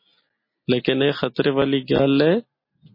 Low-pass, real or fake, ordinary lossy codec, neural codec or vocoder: 5.4 kHz; real; MP3, 24 kbps; none